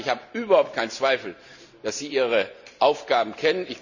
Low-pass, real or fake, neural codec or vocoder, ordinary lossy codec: 7.2 kHz; real; none; none